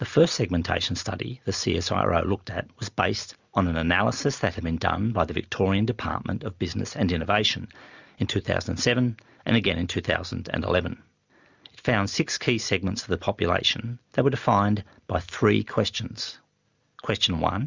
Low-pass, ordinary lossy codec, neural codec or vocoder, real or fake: 7.2 kHz; Opus, 64 kbps; none; real